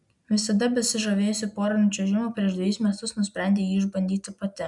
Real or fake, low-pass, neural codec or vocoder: real; 10.8 kHz; none